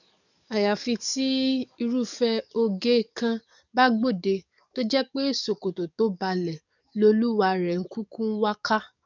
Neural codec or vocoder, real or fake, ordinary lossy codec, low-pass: codec, 44.1 kHz, 7.8 kbps, DAC; fake; none; 7.2 kHz